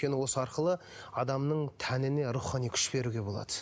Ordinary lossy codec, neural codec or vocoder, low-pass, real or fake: none; none; none; real